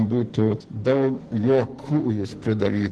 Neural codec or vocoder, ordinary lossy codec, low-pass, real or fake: codec, 44.1 kHz, 2.6 kbps, SNAC; Opus, 16 kbps; 10.8 kHz; fake